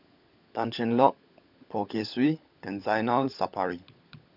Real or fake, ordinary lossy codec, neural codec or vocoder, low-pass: fake; none; codec, 16 kHz, 16 kbps, FunCodec, trained on LibriTTS, 50 frames a second; 5.4 kHz